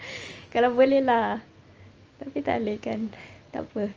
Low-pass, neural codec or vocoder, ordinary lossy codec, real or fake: 7.2 kHz; none; Opus, 24 kbps; real